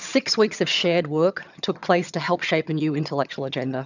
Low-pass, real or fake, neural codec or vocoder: 7.2 kHz; fake; vocoder, 22.05 kHz, 80 mel bands, HiFi-GAN